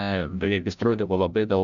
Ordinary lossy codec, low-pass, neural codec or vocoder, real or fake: Opus, 64 kbps; 7.2 kHz; codec, 16 kHz, 0.5 kbps, FreqCodec, larger model; fake